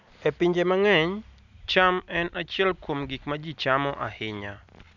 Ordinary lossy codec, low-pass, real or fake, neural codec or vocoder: none; 7.2 kHz; real; none